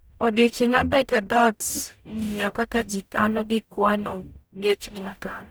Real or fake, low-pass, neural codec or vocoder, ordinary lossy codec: fake; none; codec, 44.1 kHz, 0.9 kbps, DAC; none